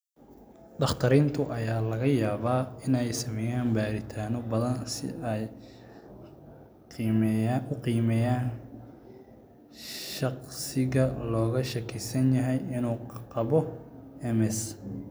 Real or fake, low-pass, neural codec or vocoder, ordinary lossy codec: real; none; none; none